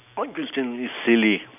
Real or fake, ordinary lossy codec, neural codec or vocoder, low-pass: real; none; none; 3.6 kHz